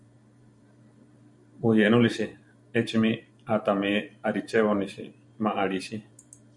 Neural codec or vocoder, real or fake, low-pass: vocoder, 44.1 kHz, 128 mel bands every 512 samples, BigVGAN v2; fake; 10.8 kHz